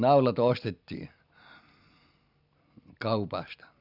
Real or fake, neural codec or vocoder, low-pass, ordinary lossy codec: real; none; 5.4 kHz; none